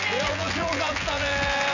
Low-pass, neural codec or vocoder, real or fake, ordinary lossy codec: 7.2 kHz; vocoder, 24 kHz, 100 mel bands, Vocos; fake; none